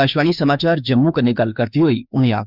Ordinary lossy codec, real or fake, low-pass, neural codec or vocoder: Opus, 64 kbps; fake; 5.4 kHz; autoencoder, 48 kHz, 32 numbers a frame, DAC-VAE, trained on Japanese speech